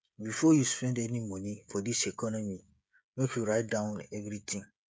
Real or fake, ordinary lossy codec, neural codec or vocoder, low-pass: fake; none; codec, 16 kHz, 16 kbps, FreqCodec, smaller model; none